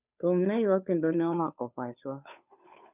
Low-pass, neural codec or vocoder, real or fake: 3.6 kHz; codec, 16 kHz, 2 kbps, FunCodec, trained on Chinese and English, 25 frames a second; fake